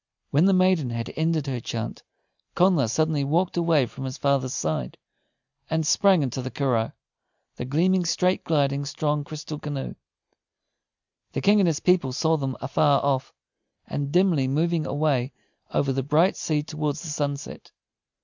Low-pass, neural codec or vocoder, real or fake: 7.2 kHz; none; real